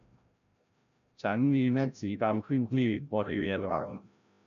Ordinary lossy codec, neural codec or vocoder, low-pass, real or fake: none; codec, 16 kHz, 0.5 kbps, FreqCodec, larger model; 7.2 kHz; fake